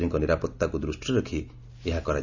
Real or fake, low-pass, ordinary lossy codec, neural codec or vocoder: real; 7.2 kHz; Opus, 64 kbps; none